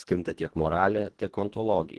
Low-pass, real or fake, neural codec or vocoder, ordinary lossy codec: 10.8 kHz; fake; codec, 24 kHz, 1.5 kbps, HILCodec; Opus, 16 kbps